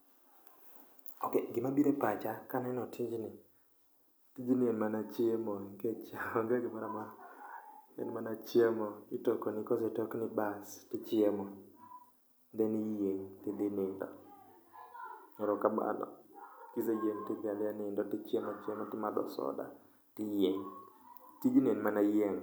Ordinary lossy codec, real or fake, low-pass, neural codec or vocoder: none; real; none; none